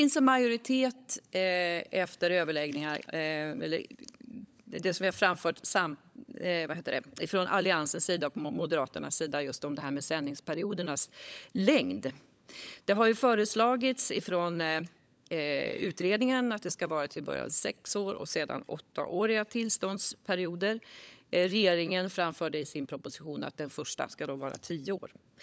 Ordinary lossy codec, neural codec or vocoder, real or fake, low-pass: none; codec, 16 kHz, 16 kbps, FunCodec, trained on LibriTTS, 50 frames a second; fake; none